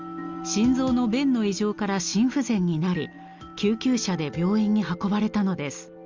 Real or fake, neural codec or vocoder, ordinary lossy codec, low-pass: real; none; Opus, 32 kbps; 7.2 kHz